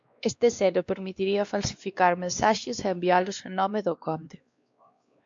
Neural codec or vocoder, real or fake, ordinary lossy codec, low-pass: codec, 16 kHz, 2 kbps, X-Codec, WavLM features, trained on Multilingual LibriSpeech; fake; MP3, 64 kbps; 7.2 kHz